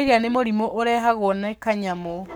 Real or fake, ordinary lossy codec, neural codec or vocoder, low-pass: fake; none; codec, 44.1 kHz, 7.8 kbps, Pupu-Codec; none